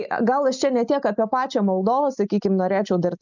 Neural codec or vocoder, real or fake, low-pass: none; real; 7.2 kHz